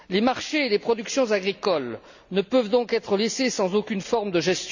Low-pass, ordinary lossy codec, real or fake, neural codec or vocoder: 7.2 kHz; none; real; none